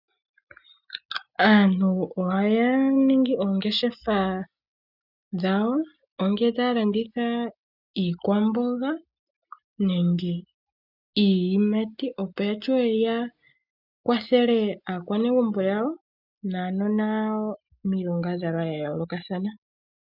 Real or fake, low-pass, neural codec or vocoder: real; 5.4 kHz; none